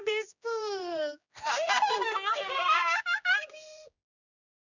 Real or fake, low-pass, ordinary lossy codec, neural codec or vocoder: fake; 7.2 kHz; none; codec, 16 kHz, 1 kbps, X-Codec, HuBERT features, trained on general audio